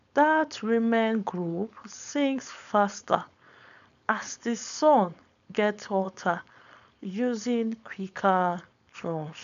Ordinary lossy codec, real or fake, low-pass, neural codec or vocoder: none; fake; 7.2 kHz; codec, 16 kHz, 4.8 kbps, FACodec